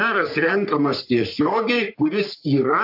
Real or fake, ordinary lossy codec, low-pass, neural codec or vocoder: fake; AAC, 48 kbps; 5.4 kHz; codec, 44.1 kHz, 3.4 kbps, Pupu-Codec